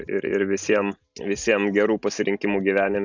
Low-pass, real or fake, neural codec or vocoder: 7.2 kHz; real; none